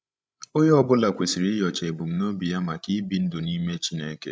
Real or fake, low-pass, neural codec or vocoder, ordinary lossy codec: fake; none; codec, 16 kHz, 16 kbps, FreqCodec, larger model; none